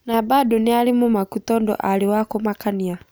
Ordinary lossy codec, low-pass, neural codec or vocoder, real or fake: none; none; none; real